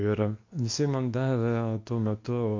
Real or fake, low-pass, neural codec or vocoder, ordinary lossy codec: fake; 7.2 kHz; codec, 24 kHz, 0.9 kbps, WavTokenizer, medium speech release version 2; MP3, 48 kbps